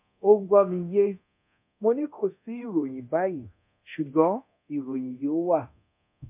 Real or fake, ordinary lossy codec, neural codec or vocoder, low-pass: fake; MP3, 32 kbps; codec, 24 kHz, 0.9 kbps, DualCodec; 3.6 kHz